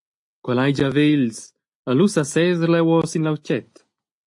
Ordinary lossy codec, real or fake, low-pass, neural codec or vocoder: AAC, 64 kbps; real; 10.8 kHz; none